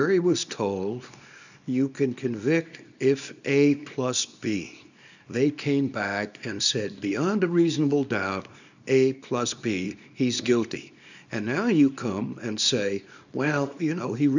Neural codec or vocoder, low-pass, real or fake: codec, 24 kHz, 0.9 kbps, WavTokenizer, small release; 7.2 kHz; fake